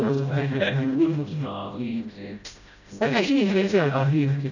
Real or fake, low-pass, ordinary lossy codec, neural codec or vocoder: fake; 7.2 kHz; none; codec, 16 kHz, 0.5 kbps, FreqCodec, smaller model